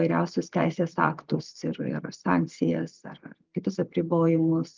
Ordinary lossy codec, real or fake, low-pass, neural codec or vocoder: Opus, 32 kbps; real; 7.2 kHz; none